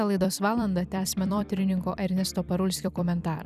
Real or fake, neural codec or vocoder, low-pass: fake; vocoder, 44.1 kHz, 128 mel bands every 256 samples, BigVGAN v2; 14.4 kHz